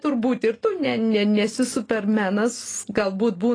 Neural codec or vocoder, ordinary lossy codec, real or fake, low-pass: none; AAC, 32 kbps; real; 9.9 kHz